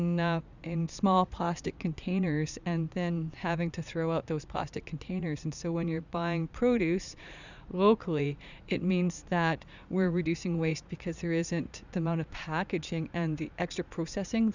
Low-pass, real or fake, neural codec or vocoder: 7.2 kHz; fake; vocoder, 44.1 kHz, 80 mel bands, Vocos